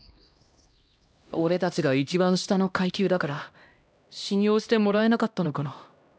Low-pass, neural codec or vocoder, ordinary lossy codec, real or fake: none; codec, 16 kHz, 1 kbps, X-Codec, HuBERT features, trained on LibriSpeech; none; fake